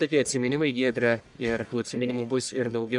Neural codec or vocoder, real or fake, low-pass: codec, 44.1 kHz, 1.7 kbps, Pupu-Codec; fake; 10.8 kHz